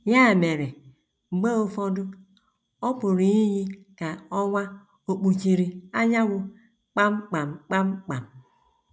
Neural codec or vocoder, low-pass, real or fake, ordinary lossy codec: none; none; real; none